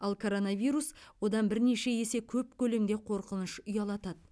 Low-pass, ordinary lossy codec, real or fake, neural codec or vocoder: none; none; real; none